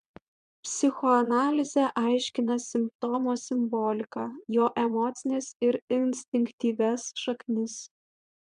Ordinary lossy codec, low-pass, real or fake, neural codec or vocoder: MP3, 96 kbps; 9.9 kHz; fake; vocoder, 22.05 kHz, 80 mel bands, Vocos